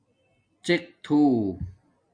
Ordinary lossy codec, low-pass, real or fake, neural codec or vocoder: MP3, 96 kbps; 9.9 kHz; real; none